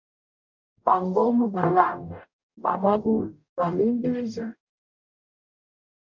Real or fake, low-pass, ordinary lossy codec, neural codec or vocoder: fake; 7.2 kHz; MP3, 48 kbps; codec, 44.1 kHz, 0.9 kbps, DAC